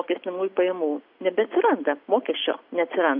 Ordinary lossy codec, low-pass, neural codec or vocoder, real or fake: AAC, 48 kbps; 5.4 kHz; none; real